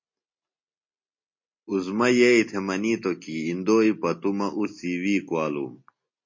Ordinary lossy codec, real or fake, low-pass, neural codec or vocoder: MP3, 32 kbps; real; 7.2 kHz; none